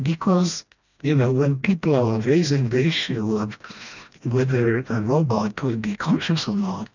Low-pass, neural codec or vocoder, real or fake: 7.2 kHz; codec, 16 kHz, 1 kbps, FreqCodec, smaller model; fake